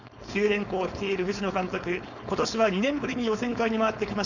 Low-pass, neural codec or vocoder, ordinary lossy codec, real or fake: 7.2 kHz; codec, 16 kHz, 4.8 kbps, FACodec; none; fake